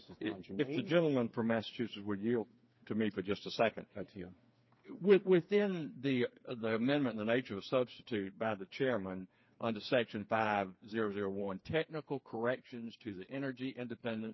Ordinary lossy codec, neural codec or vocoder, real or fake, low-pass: MP3, 24 kbps; codec, 16 kHz, 4 kbps, FreqCodec, smaller model; fake; 7.2 kHz